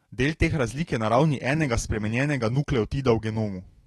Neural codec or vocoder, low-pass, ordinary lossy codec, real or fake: autoencoder, 48 kHz, 128 numbers a frame, DAC-VAE, trained on Japanese speech; 19.8 kHz; AAC, 32 kbps; fake